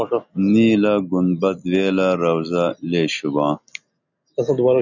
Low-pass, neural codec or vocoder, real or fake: 7.2 kHz; none; real